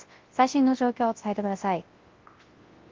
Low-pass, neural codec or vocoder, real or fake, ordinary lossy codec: 7.2 kHz; codec, 24 kHz, 0.9 kbps, WavTokenizer, large speech release; fake; Opus, 16 kbps